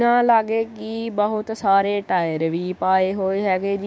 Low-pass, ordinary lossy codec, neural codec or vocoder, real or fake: none; none; codec, 16 kHz, 6 kbps, DAC; fake